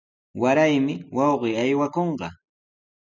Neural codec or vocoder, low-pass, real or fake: none; 7.2 kHz; real